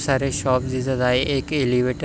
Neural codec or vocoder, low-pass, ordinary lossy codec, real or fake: none; none; none; real